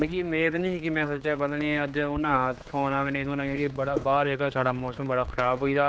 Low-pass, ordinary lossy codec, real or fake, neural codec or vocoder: none; none; fake; codec, 16 kHz, 4 kbps, X-Codec, HuBERT features, trained on general audio